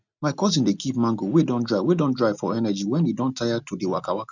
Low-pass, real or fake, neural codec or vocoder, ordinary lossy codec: 7.2 kHz; real; none; none